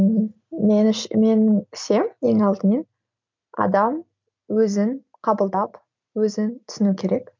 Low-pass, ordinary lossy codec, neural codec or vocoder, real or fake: 7.2 kHz; MP3, 64 kbps; none; real